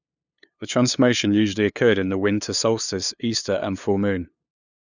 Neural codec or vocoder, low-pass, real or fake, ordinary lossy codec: codec, 16 kHz, 2 kbps, FunCodec, trained on LibriTTS, 25 frames a second; 7.2 kHz; fake; none